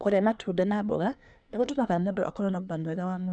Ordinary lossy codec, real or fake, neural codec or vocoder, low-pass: none; fake; codec, 24 kHz, 1 kbps, SNAC; 9.9 kHz